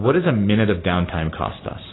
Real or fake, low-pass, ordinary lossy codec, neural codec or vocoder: real; 7.2 kHz; AAC, 16 kbps; none